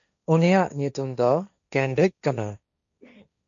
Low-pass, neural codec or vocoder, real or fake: 7.2 kHz; codec, 16 kHz, 1.1 kbps, Voila-Tokenizer; fake